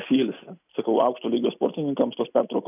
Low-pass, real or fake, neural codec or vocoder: 3.6 kHz; real; none